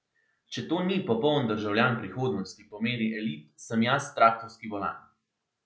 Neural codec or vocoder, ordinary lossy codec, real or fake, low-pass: none; none; real; none